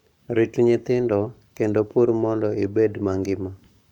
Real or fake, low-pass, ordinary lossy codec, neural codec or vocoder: fake; 19.8 kHz; none; codec, 44.1 kHz, 7.8 kbps, Pupu-Codec